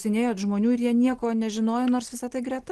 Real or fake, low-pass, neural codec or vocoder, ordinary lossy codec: real; 10.8 kHz; none; Opus, 16 kbps